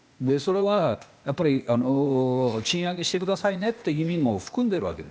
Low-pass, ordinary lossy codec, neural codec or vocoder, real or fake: none; none; codec, 16 kHz, 0.8 kbps, ZipCodec; fake